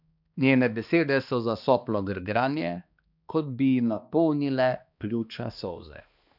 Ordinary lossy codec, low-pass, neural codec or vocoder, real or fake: none; 5.4 kHz; codec, 16 kHz, 2 kbps, X-Codec, HuBERT features, trained on balanced general audio; fake